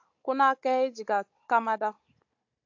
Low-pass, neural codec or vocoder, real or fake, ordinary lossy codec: 7.2 kHz; codec, 16 kHz, 16 kbps, FunCodec, trained on Chinese and English, 50 frames a second; fake; AAC, 48 kbps